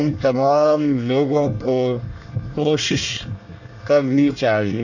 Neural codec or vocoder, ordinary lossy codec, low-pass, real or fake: codec, 24 kHz, 1 kbps, SNAC; none; 7.2 kHz; fake